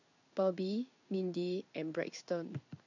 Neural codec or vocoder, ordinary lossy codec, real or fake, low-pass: codec, 16 kHz in and 24 kHz out, 1 kbps, XY-Tokenizer; none; fake; 7.2 kHz